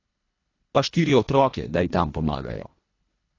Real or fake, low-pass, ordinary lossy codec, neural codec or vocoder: fake; 7.2 kHz; AAC, 32 kbps; codec, 24 kHz, 1.5 kbps, HILCodec